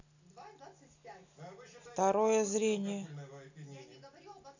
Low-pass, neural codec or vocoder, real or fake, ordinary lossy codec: 7.2 kHz; none; real; none